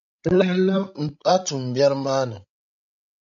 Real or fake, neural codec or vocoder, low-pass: fake; codec, 16 kHz, 16 kbps, FreqCodec, larger model; 7.2 kHz